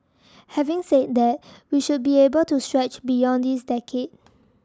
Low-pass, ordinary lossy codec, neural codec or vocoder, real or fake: none; none; none; real